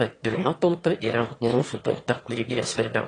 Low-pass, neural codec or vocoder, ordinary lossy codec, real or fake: 9.9 kHz; autoencoder, 22.05 kHz, a latent of 192 numbers a frame, VITS, trained on one speaker; AAC, 32 kbps; fake